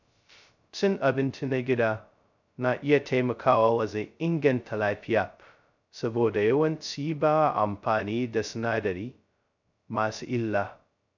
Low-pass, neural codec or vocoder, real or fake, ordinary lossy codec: 7.2 kHz; codec, 16 kHz, 0.2 kbps, FocalCodec; fake; none